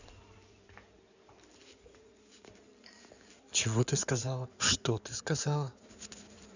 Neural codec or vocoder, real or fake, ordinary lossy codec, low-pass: codec, 16 kHz in and 24 kHz out, 2.2 kbps, FireRedTTS-2 codec; fake; none; 7.2 kHz